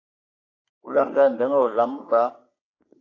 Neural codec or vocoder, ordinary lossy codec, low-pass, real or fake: codec, 44.1 kHz, 3.4 kbps, Pupu-Codec; AAC, 32 kbps; 7.2 kHz; fake